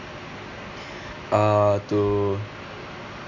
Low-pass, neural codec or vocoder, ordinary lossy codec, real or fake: 7.2 kHz; none; none; real